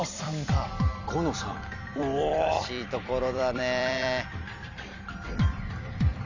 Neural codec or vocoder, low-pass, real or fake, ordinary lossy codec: vocoder, 44.1 kHz, 128 mel bands every 256 samples, BigVGAN v2; 7.2 kHz; fake; Opus, 64 kbps